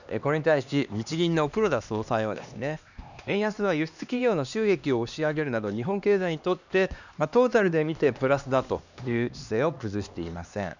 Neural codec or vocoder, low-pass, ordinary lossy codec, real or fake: codec, 16 kHz, 2 kbps, X-Codec, HuBERT features, trained on LibriSpeech; 7.2 kHz; none; fake